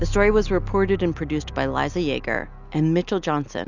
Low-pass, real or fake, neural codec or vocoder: 7.2 kHz; real; none